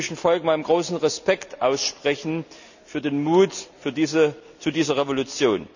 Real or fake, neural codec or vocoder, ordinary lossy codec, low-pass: real; none; none; 7.2 kHz